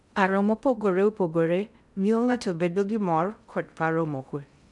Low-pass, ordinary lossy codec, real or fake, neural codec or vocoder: 10.8 kHz; none; fake; codec, 16 kHz in and 24 kHz out, 0.6 kbps, FocalCodec, streaming, 2048 codes